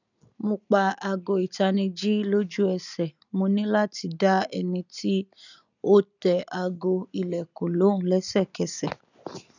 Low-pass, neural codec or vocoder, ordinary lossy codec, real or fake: 7.2 kHz; none; none; real